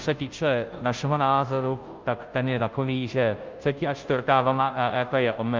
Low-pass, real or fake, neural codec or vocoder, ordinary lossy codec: 7.2 kHz; fake; codec, 16 kHz, 0.5 kbps, FunCodec, trained on Chinese and English, 25 frames a second; Opus, 32 kbps